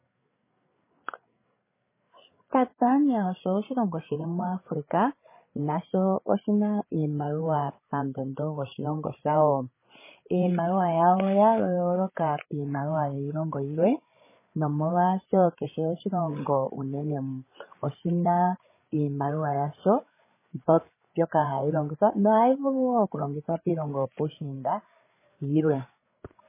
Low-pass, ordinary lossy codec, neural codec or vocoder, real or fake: 3.6 kHz; MP3, 16 kbps; codec, 16 kHz, 8 kbps, FreqCodec, larger model; fake